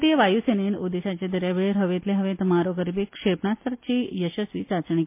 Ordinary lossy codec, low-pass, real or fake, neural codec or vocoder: MP3, 24 kbps; 3.6 kHz; real; none